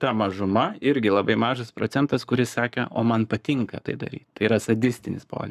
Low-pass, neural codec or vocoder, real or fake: 14.4 kHz; codec, 44.1 kHz, 7.8 kbps, Pupu-Codec; fake